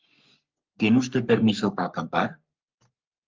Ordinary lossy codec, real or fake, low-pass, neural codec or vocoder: Opus, 24 kbps; fake; 7.2 kHz; codec, 44.1 kHz, 3.4 kbps, Pupu-Codec